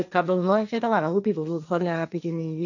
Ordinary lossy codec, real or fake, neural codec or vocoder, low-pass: none; fake; codec, 16 kHz, 1.1 kbps, Voila-Tokenizer; 7.2 kHz